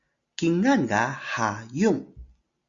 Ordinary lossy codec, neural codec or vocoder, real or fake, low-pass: Opus, 64 kbps; none; real; 7.2 kHz